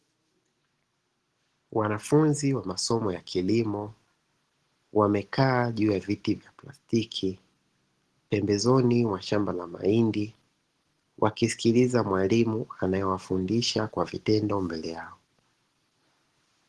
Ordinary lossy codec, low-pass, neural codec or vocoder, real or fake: Opus, 16 kbps; 10.8 kHz; none; real